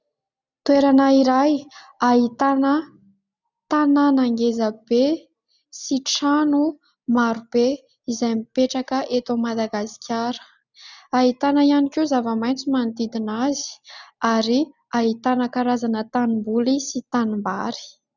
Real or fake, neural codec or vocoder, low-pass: real; none; 7.2 kHz